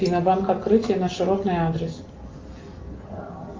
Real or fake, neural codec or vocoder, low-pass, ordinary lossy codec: real; none; 7.2 kHz; Opus, 32 kbps